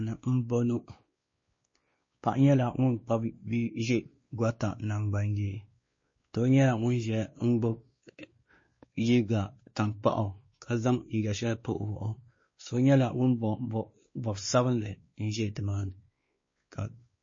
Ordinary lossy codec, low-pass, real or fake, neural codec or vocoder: MP3, 32 kbps; 7.2 kHz; fake; codec, 16 kHz, 2 kbps, X-Codec, WavLM features, trained on Multilingual LibriSpeech